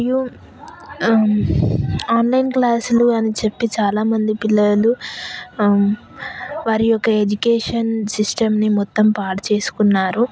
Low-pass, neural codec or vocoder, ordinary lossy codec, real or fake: none; none; none; real